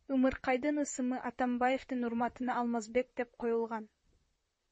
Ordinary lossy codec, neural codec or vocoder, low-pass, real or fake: MP3, 32 kbps; vocoder, 44.1 kHz, 128 mel bands, Pupu-Vocoder; 10.8 kHz; fake